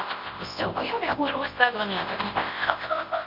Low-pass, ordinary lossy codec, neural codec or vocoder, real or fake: 5.4 kHz; MP3, 32 kbps; codec, 24 kHz, 0.9 kbps, WavTokenizer, large speech release; fake